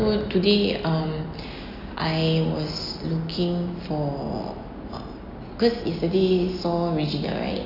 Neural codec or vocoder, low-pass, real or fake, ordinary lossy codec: none; 5.4 kHz; real; none